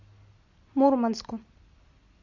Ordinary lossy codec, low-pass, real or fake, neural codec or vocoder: MP3, 48 kbps; 7.2 kHz; real; none